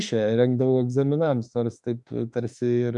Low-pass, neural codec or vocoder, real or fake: 10.8 kHz; autoencoder, 48 kHz, 32 numbers a frame, DAC-VAE, trained on Japanese speech; fake